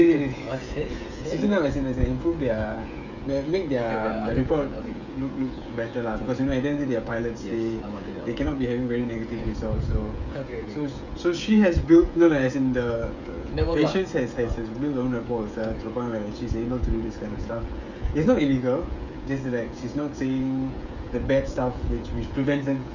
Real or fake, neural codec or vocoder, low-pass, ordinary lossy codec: fake; codec, 16 kHz, 16 kbps, FreqCodec, smaller model; 7.2 kHz; none